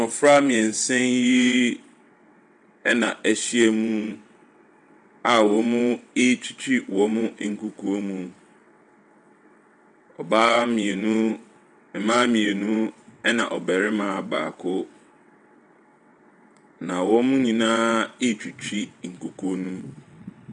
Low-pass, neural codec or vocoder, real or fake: 9.9 kHz; vocoder, 22.05 kHz, 80 mel bands, WaveNeXt; fake